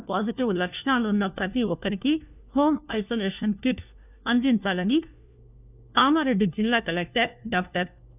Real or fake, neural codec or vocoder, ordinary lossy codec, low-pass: fake; codec, 16 kHz, 1 kbps, FunCodec, trained on LibriTTS, 50 frames a second; none; 3.6 kHz